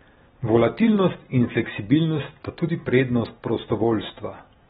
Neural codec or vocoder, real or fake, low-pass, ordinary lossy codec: none; real; 19.8 kHz; AAC, 16 kbps